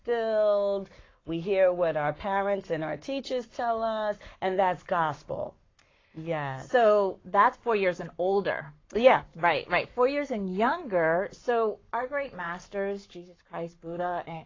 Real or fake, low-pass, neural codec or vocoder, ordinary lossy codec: fake; 7.2 kHz; codec, 44.1 kHz, 7.8 kbps, Pupu-Codec; AAC, 32 kbps